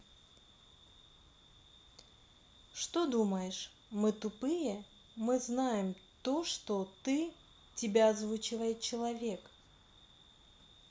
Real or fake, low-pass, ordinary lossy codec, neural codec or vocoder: real; none; none; none